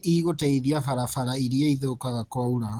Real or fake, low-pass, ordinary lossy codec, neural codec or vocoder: real; 19.8 kHz; Opus, 16 kbps; none